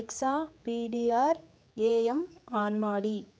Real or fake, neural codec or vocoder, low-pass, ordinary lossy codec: fake; codec, 16 kHz, 4 kbps, X-Codec, HuBERT features, trained on general audio; none; none